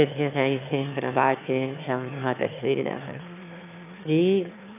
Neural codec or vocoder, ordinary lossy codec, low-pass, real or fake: autoencoder, 22.05 kHz, a latent of 192 numbers a frame, VITS, trained on one speaker; none; 3.6 kHz; fake